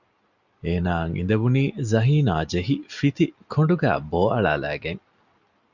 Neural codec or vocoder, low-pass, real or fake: none; 7.2 kHz; real